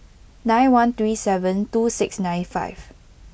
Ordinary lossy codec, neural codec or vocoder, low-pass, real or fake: none; none; none; real